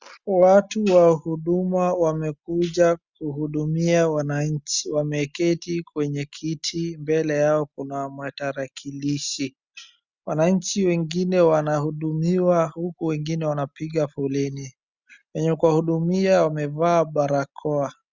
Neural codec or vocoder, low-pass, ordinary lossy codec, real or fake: none; 7.2 kHz; Opus, 64 kbps; real